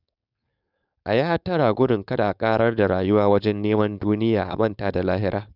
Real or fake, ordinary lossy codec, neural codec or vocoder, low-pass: fake; none; codec, 16 kHz, 4.8 kbps, FACodec; 5.4 kHz